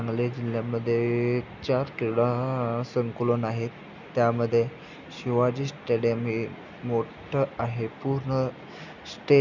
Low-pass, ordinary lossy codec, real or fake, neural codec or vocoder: 7.2 kHz; none; real; none